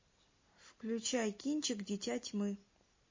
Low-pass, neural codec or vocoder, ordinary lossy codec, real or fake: 7.2 kHz; none; MP3, 32 kbps; real